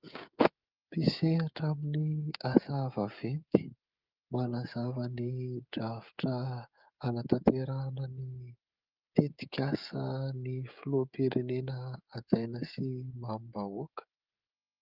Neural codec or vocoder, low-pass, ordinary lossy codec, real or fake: codec, 16 kHz, 16 kbps, FreqCodec, smaller model; 5.4 kHz; Opus, 32 kbps; fake